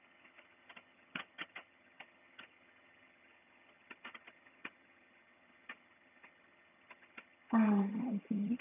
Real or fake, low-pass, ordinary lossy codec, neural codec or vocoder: fake; 3.6 kHz; none; vocoder, 22.05 kHz, 80 mel bands, HiFi-GAN